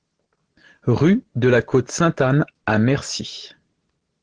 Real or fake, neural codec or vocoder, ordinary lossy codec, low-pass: real; none; Opus, 16 kbps; 9.9 kHz